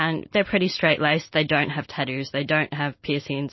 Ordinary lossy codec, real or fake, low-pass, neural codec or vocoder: MP3, 24 kbps; real; 7.2 kHz; none